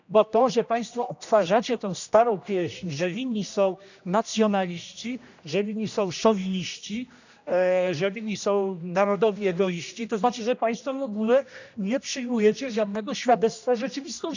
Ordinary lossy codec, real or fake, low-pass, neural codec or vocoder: none; fake; 7.2 kHz; codec, 16 kHz, 1 kbps, X-Codec, HuBERT features, trained on general audio